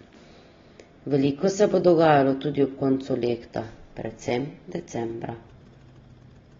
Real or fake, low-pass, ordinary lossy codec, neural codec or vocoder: real; 7.2 kHz; AAC, 24 kbps; none